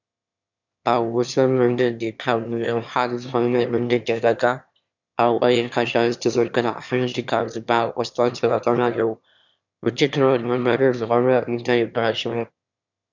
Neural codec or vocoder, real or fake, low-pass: autoencoder, 22.05 kHz, a latent of 192 numbers a frame, VITS, trained on one speaker; fake; 7.2 kHz